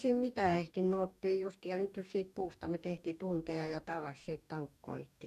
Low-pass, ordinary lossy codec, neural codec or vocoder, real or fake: 14.4 kHz; none; codec, 44.1 kHz, 2.6 kbps, DAC; fake